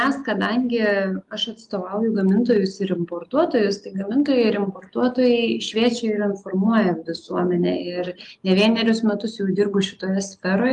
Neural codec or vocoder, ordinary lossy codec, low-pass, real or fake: none; Opus, 32 kbps; 10.8 kHz; real